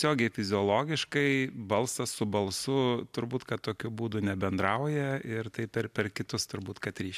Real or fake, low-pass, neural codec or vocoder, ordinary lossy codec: real; 14.4 kHz; none; AAC, 96 kbps